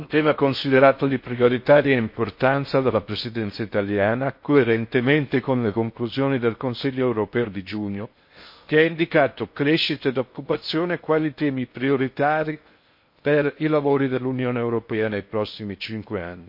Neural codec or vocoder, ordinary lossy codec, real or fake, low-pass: codec, 16 kHz in and 24 kHz out, 0.6 kbps, FocalCodec, streaming, 4096 codes; MP3, 32 kbps; fake; 5.4 kHz